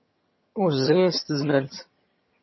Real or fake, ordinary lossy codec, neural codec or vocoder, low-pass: fake; MP3, 24 kbps; vocoder, 22.05 kHz, 80 mel bands, HiFi-GAN; 7.2 kHz